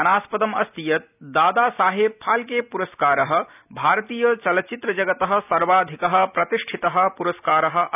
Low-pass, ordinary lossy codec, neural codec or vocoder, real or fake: 3.6 kHz; none; none; real